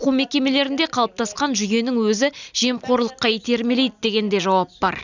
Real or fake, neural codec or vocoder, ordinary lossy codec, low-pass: real; none; none; 7.2 kHz